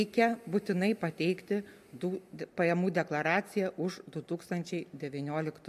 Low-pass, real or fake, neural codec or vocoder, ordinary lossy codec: 14.4 kHz; real; none; MP3, 64 kbps